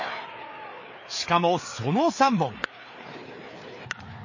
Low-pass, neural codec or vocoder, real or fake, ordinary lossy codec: 7.2 kHz; codec, 16 kHz, 4 kbps, FreqCodec, larger model; fake; MP3, 32 kbps